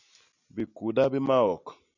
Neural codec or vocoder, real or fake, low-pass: none; real; 7.2 kHz